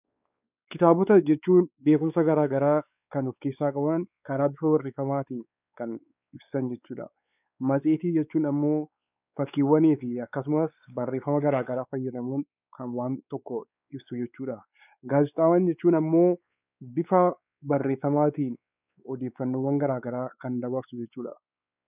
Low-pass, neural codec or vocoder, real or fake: 3.6 kHz; codec, 16 kHz, 4 kbps, X-Codec, WavLM features, trained on Multilingual LibriSpeech; fake